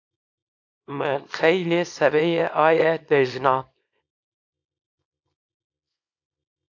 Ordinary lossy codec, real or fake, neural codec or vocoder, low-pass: AAC, 48 kbps; fake; codec, 24 kHz, 0.9 kbps, WavTokenizer, small release; 7.2 kHz